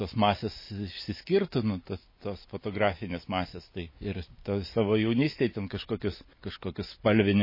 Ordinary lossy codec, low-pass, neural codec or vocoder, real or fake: MP3, 24 kbps; 5.4 kHz; none; real